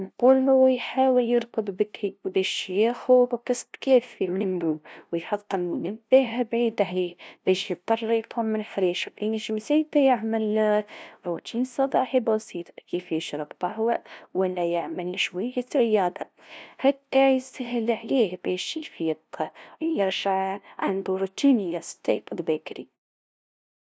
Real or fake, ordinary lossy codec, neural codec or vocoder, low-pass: fake; none; codec, 16 kHz, 0.5 kbps, FunCodec, trained on LibriTTS, 25 frames a second; none